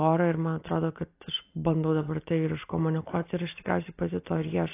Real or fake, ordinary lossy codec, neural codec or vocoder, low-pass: real; AAC, 24 kbps; none; 3.6 kHz